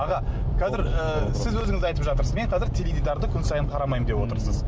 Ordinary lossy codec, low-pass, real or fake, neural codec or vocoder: none; none; real; none